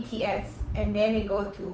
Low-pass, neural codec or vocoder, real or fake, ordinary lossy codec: none; codec, 16 kHz, 8 kbps, FunCodec, trained on Chinese and English, 25 frames a second; fake; none